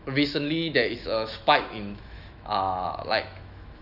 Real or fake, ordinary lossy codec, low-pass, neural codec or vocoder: fake; none; 5.4 kHz; autoencoder, 48 kHz, 128 numbers a frame, DAC-VAE, trained on Japanese speech